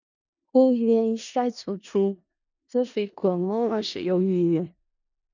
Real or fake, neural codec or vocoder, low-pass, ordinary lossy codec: fake; codec, 16 kHz in and 24 kHz out, 0.4 kbps, LongCat-Audio-Codec, four codebook decoder; 7.2 kHz; none